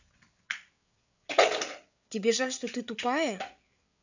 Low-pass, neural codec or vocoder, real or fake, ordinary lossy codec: 7.2 kHz; codec, 16 kHz, 8 kbps, FreqCodec, larger model; fake; none